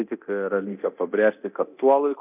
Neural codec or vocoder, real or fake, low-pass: codec, 24 kHz, 0.9 kbps, DualCodec; fake; 3.6 kHz